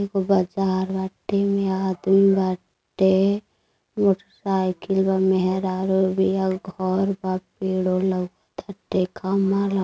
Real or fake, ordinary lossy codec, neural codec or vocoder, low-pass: real; none; none; none